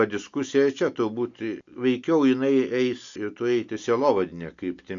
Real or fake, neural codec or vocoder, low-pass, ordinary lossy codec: real; none; 7.2 kHz; MP3, 64 kbps